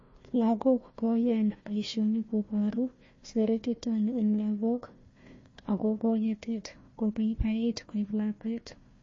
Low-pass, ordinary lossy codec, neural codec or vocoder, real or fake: 7.2 kHz; MP3, 32 kbps; codec, 16 kHz, 1 kbps, FunCodec, trained on Chinese and English, 50 frames a second; fake